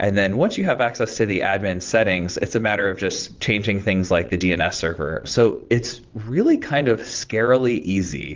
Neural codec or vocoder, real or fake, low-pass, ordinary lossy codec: vocoder, 22.05 kHz, 80 mel bands, WaveNeXt; fake; 7.2 kHz; Opus, 32 kbps